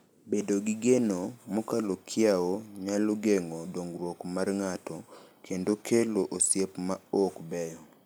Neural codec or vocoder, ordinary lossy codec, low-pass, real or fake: none; none; none; real